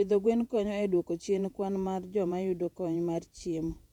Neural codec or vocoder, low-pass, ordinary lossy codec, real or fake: vocoder, 48 kHz, 128 mel bands, Vocos; 19.8 kHz; none; fake